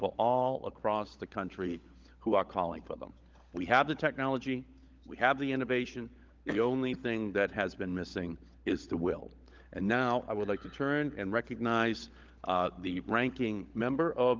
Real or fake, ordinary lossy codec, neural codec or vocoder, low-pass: fake; Opus, 32 kbps; codec, 16 kHz, 16 kbps, FunCodec, trained on LibriTTS, 50 frames a second; 7.2 kHz